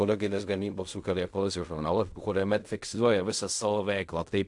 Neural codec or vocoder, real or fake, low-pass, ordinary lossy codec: codec, 16 kHz in and 24 kHz out, 0.4 kbps, LongCat-Audio-Codec, fine tuned four codebook decoder; fake; 10.8 kHz; AAC, 64 kbps